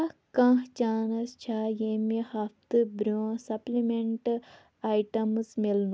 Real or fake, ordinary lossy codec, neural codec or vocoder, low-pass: real; none; none; none